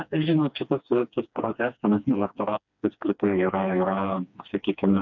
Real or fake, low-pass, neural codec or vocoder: fake; 7.2 kHz; codec, 16 kHz, 2 kbps, FreqCodec, smaller model